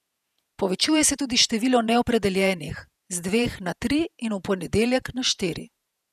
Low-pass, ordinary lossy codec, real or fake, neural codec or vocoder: 14.4 kHz; none; fake; vocoder, 48 kHz, 128 mel bands, Vocos